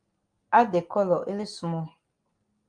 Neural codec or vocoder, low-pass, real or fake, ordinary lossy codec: none; 9.9 kHz; real; Opus, 32 kbps